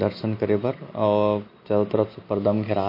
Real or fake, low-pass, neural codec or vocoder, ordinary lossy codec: real; 5.4 kHz; none; MP3, 32 kbps